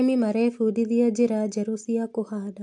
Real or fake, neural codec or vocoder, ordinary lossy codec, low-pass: real; none; AAC, 64 kbps; 10.8 kHz